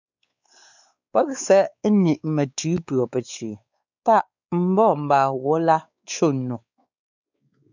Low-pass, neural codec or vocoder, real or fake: 7.2 kHz; codec, 16 kHz, 4 kbps, X-Codec, WavLM features, trained on Multilingual LibriSpeech; fake